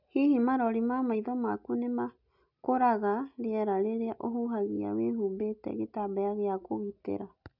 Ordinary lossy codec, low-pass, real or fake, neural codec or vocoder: none; 5.4 kHz; real; none